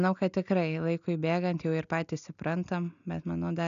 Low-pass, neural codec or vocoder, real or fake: 7.2 kHz; none; real